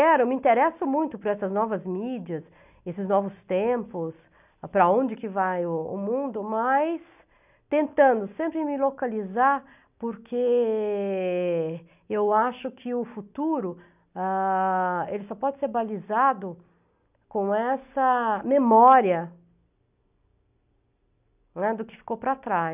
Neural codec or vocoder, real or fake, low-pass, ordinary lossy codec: none; real; 3.6 kHz; none